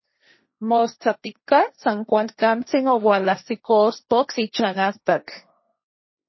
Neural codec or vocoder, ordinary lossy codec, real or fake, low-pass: codec, 16 kHz, 1.1 kbps, Voila-Tokenizer; MP3, 24 kbps; fake; 7.2 kHz